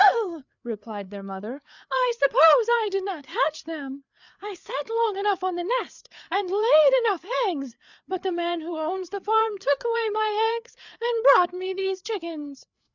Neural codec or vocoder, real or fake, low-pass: codec, 16 kHz, 4 kbps, FreqCodec, larger model; fake; 7.2 kHz